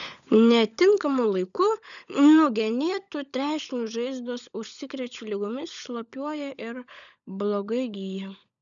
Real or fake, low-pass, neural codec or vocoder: fake; 7.2 kHz; codec, 16 kHz, 16 kbps, FunCodec, trained on LibriTTS, 50 frames a second